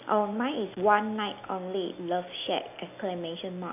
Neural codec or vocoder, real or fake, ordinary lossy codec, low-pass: none; real; none; 3.6 kHz